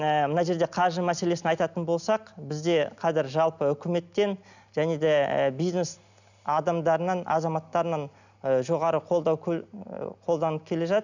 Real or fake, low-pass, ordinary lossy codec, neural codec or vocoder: real; 7.2 kHz; none; none